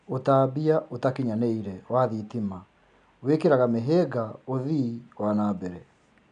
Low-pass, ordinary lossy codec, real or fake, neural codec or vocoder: 9.9 kHz; none; real; none